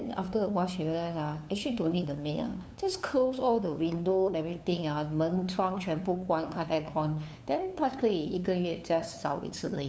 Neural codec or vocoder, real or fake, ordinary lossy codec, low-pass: codec, 16 kHz, 2 kbps, FunCodec, trained on LibriTTS, 25 frames a second; fake; none; none